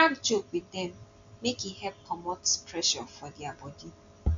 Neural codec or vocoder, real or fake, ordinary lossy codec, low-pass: none; real; AAC, 64 kbps; 7.2 kHz